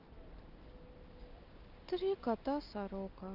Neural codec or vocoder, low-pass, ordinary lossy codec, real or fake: none; 5.4 kHz; Opus, 32 kbps; real